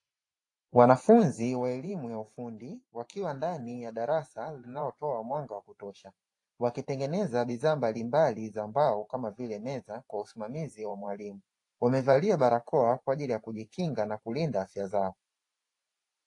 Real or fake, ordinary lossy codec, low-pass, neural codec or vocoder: fake; AAC, 48 kbps; 10.8 kHz; vocoder, 44.1 kHz, 128 mel bands every 512 samples, BigVGAN v2